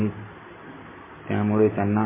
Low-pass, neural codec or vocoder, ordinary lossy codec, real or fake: 3.6 kHz; none; MP3, 16 kbps; real